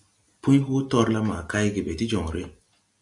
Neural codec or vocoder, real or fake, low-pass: none; real; 10.8 kHz